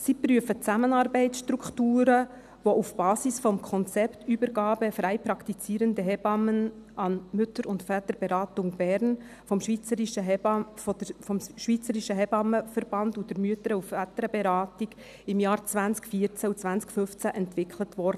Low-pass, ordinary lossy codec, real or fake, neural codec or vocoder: 14.4 kHz; none; real; none